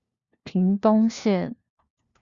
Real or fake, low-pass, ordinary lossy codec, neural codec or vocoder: fake; 7.2 kHz; Opus, 64 kbps; codec, 16 kHz, 1 kbps, FunCodec, trained on LibriTTS, 50 frames a second